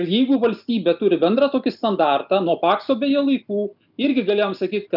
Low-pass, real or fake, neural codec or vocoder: 5.4 kHz; real; none